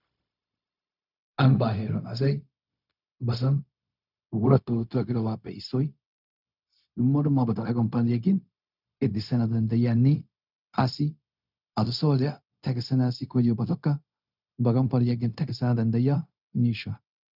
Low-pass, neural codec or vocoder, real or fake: 5.4 kHz; codec, 16 kHz, 0.4 kbps, LongCat-Audio-Codec; fake